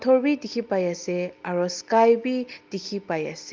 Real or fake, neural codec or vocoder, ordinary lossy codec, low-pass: real; none; Opus, 32 kbps; 7.2 kHz